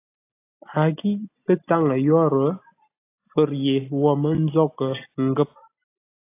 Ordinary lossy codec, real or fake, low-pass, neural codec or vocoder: AAC, 24 kbps; real; 3.6 kHz; none